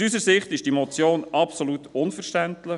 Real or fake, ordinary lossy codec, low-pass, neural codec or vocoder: real; none; 10.8 kHz; none